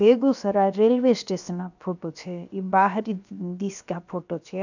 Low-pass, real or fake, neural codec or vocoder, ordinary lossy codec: 7.2 kHz; fake; codec, 16 kHz, 0.7 kbps, FocalCodec; none